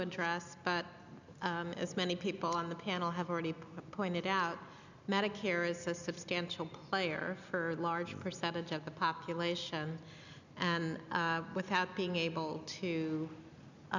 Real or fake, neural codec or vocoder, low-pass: real; none; 7.2 kHz